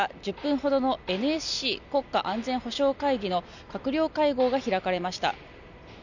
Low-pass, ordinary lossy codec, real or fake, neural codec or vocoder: 7.2 kHz; none; real; none